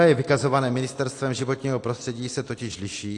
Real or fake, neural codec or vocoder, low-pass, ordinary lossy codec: real; none; 10.8 kHz; AAC, 48 kbps